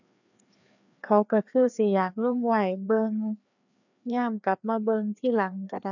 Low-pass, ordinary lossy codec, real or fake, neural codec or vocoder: 7.2 kHz; none; fake; codec, 16 kHz, 2 kbps, FreqCodec, larger model